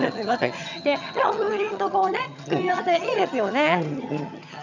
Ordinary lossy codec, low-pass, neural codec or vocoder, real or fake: none; 7.2 kHz; vocoder, 22.05 kHz, 80 mel bands, HiFi-GAN; fake